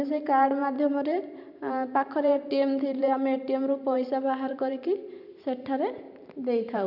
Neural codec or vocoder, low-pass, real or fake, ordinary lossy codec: vocoder, 44.1 kHz, 128 mel bands every 512 samples, BigVGAN v2; 5.4 kHz; fake; none